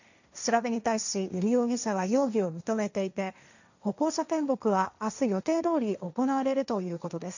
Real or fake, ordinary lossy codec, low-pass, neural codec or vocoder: fake; none; 7.2 kHz; codec, 16 kHz, 1.1 kbps, Voila-Tokenizer